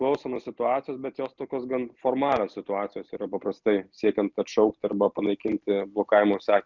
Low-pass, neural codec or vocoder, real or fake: 7.2 kHz; none; real